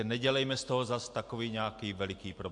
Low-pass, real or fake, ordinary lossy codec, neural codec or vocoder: 10.8 kHz; real; AAC, 64 kbps; none